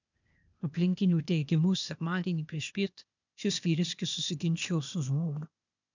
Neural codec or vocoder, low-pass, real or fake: codec, 16 kHz, 0.8 kbps, ZipCodec; 7.2 kHz; fake